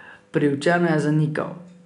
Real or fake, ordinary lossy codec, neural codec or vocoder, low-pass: real; none; none; 10.8 kHz